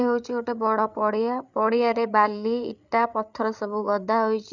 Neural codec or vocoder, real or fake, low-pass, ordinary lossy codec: codec, 16 kHz, 8 kbps, FreqCodec, larger model; fake; 7.2 kHz; none